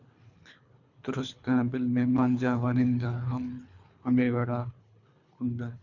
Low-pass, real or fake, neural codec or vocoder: 7.2 kHz; fake; codec, 24 kHz, 3 kbps, HILCodec